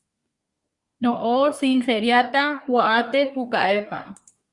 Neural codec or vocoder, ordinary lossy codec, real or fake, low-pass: codec, 24 kHz, 1 kbps, SNAC; Opus, 64 kbps; fake; 10.8 kHz